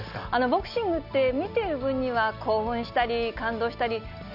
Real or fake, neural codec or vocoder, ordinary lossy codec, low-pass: real; none; none; 5.4 kHz